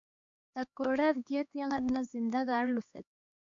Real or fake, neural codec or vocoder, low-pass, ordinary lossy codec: fake; codec, 16 kHz, 2 kbps, X-Codec, HuBERT features, trained on LibriSpeech; 7.2 kHz; MP3, 64 kbps